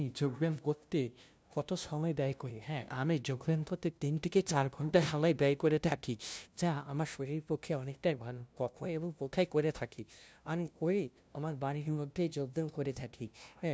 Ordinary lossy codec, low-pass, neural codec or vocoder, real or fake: none; none; codec, 16 kHz, 0.5 kbps, FunCodec, trained on LibriTTS, 25 frames a second; fake